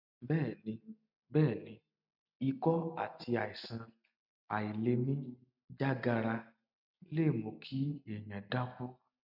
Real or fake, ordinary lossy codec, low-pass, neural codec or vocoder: real; none; 5.4 kHz; none